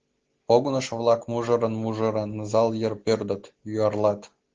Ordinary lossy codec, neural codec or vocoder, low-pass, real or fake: Opus, 16 kbps; none; 7.2 kHz; real